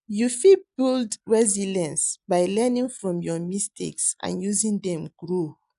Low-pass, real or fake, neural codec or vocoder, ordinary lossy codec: 10.8 kHz; real; none; none